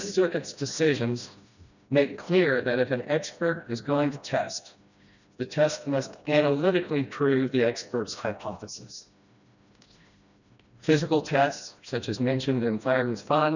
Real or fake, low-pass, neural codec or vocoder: fake; 7.2 kHz; codec, 16 kHz, 1 kbps, FreqCodec, smaller model